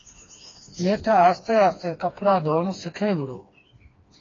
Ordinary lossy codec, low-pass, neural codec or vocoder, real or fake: AAC, 32 kbps; 7.2 kHz; codec, 16 kHz, 2 kbps, FreqCodec, smaller model; fake